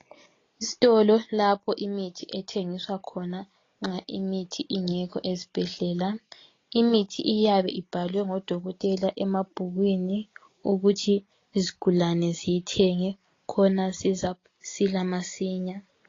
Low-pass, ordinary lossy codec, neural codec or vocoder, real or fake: 7.2 kHz; AAC, 32 kbps; none; real